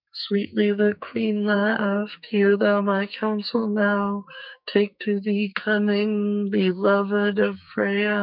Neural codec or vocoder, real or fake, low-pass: codec, 44.1 kHz, 2.6 kbps, SNAC; fake; 5.4 kHz